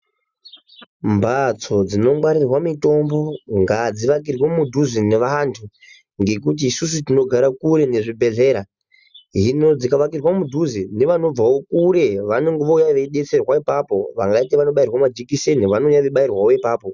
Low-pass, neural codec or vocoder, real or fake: 7.2 kHz; none; real